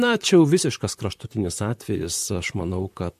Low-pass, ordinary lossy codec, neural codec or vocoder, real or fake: 14.4 kHz; MP3, 64 kbps; vocoder, 44.1 kHz, 128 mel bands, Pupu-Vocoder; fake